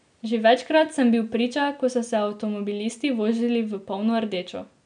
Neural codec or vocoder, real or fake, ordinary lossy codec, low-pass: none; real; none; 9.9 kHz